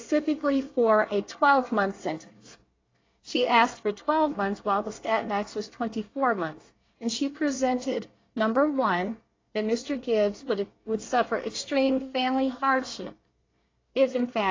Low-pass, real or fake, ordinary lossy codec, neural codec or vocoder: 7.2 kHz; fake; AAC, 32 kbps; codec, 24 kHz, 1 kbps, SNAC